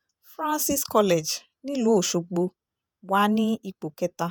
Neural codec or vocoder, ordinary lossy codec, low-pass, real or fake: vocoder, 48 kHz, 128 mel bands, Vocos; none; none; fake